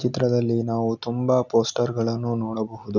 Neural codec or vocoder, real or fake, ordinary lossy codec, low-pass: none; real; none; 7.2 kHz